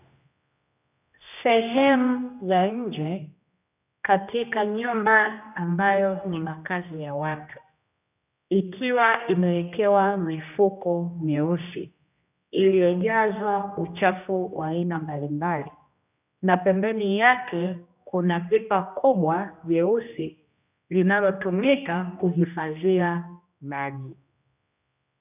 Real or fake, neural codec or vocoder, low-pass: fake; codec, 16 kHz, 1 kbps, X-Codec, HuBERT features, trained on general audio; 3.6 kHz